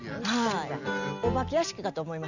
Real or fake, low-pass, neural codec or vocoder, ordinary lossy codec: real; 7.2 kHz; none; none